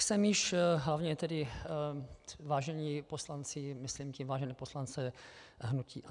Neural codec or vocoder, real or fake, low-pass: none; real; 10.8 kHz